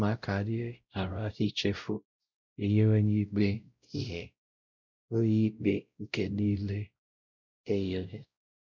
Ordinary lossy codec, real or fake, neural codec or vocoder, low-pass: none; fake; codec, 16 kHz, 0.5 kbps, X-Codec, WavLM features, trained on Multilingual LibriSpeech; 7.2 kHz